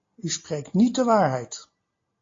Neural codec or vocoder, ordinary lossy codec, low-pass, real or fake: none; AAC, 32 kbps; 7.2 kHz; real